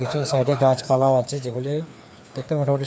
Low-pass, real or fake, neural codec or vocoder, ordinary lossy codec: none; fake; codec, 16 kHz, 2 kbps, FreqCodec, larger model; none